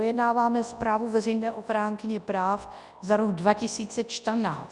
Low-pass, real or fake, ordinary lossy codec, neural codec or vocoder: 10.8 kHz; fake; Opus, 64 kbps; codec, 24 kHz, 0.9 kbps, WavTokenizer, large speech release